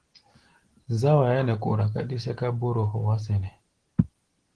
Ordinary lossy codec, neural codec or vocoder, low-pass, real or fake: Opus, 16 kbps; none; 9.9 kHz; real